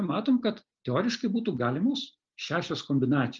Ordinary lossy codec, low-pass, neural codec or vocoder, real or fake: Opus, 32 kbps; 7.2 kHz; none; real